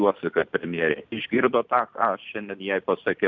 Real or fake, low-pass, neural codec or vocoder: real; 7.2 kHz; none